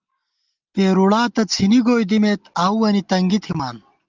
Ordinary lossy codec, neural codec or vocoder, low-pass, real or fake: Opus, 32 kbps; none; 7.2 kHz; real